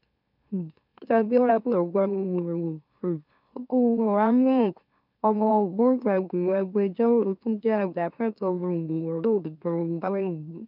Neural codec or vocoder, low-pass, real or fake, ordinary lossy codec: autoencoder, 44.1 kHz, a latent of 192 numbers a frame, MeloTTS; 5.4 kHz; fake; none